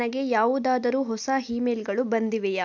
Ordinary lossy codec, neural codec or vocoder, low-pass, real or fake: none; none; none; real